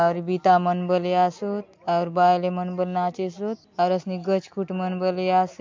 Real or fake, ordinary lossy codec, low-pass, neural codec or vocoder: real; MP3, 48 kbps; 7.2 kHz; none